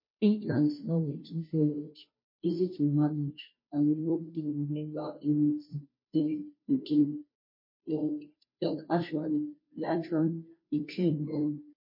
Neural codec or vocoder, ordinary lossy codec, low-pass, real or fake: codec, 16 kHz, 0.5 kbps, FunCodec, trained on Chinese and English, 25 frames a second; MP3, 24 kbps; 5.4 kHz; fake